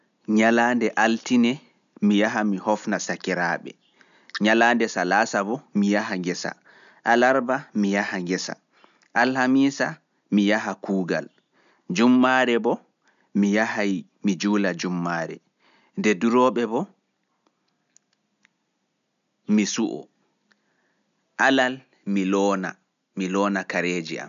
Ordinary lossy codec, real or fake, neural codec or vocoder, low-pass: none; real; none; 7.2 kHz